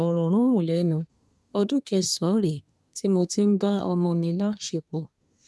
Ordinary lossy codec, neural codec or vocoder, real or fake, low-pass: none; codec, 24 kHz, 1 kbps, SNAC; fake; none